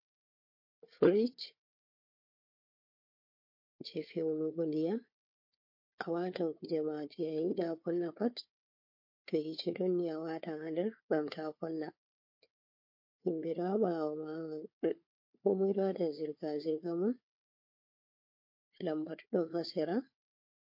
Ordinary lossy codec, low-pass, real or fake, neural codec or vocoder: MP3, 32 kbps; 5.4 kHz; fake; codec, 16 kHz, 4 kbps, FunCodec, trained on Chinese and English, 50 frames a second